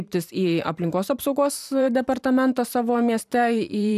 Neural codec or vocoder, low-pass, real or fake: vocoder, 44.1 kHz, 128 mel bands every 512 samples, BigVGAN v2; 14.4 kHz; fake